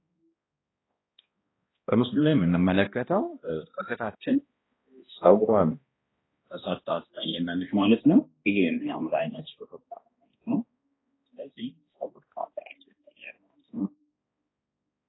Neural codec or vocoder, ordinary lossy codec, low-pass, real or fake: codec, 16 kHz, 1 kbps, X-Codec, HuBERT features, trained on balanced general audio; AAC, 16 kbps; 7.2 kHz; fake